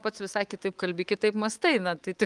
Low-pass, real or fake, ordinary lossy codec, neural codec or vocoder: 10.8 kHz; fake; Opus, 24 kbps; codec, 24 kHz, 3.1 kbps, DualCodec